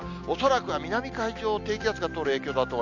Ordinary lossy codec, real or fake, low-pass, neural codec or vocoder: none; real; 7.2 kHz; none